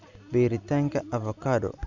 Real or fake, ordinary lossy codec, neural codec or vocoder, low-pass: real; none; none; 7.2 kHz